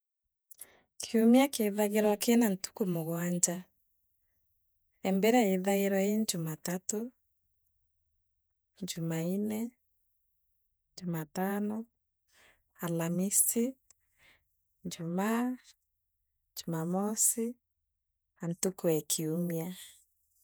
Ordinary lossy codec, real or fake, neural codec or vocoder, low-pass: none; fake; vocoder, 48 kHz, 128 mel bands, Vocos; none